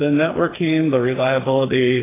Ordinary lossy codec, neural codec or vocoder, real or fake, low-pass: AAC, 16 kbps; codec, 16 kHz, 4 kbps, FreqCodec, smaller model; fake; 3.6 kHz